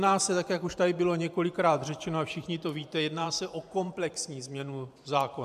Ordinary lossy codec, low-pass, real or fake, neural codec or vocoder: AAC, 96 kbps; 14.4 kHz; fake; vocoder, 44.1 kHz, 128 mel bands every 512 samples, BigVGAN v2